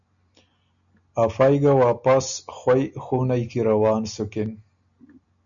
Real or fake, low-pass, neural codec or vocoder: real; 7.2 kHz; none